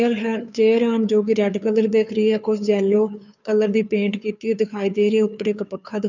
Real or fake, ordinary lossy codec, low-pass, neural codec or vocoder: fake; none; 7.2 kHz; codec, 16 kHz, 2 kbps, FunCodec, trained on Chinese and English, 25 frames a second